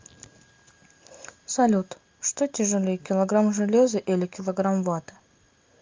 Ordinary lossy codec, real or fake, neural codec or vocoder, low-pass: Opus, 32 kbps; real; none; 7.2 kHz